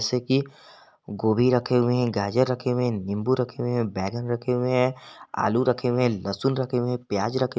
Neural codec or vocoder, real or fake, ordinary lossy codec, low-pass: none; real; none; none